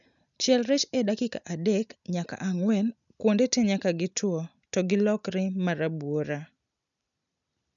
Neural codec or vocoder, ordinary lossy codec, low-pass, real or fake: none; none; 7.2 kHz; real